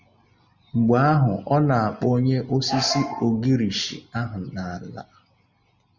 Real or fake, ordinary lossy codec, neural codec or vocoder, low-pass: real; Opus, 64 kbps; none; 7.2 kHz